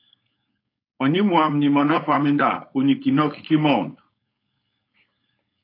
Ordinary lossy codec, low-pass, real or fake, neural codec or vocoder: AAC, 32 kbps; 5.4 kHz; fake; codec, 16 kHz, 4.8 kbps, FACodec